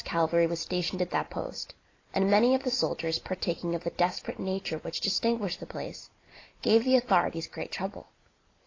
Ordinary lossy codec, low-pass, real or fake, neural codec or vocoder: AAC, 32 kbps; 7.2 kHz; real; none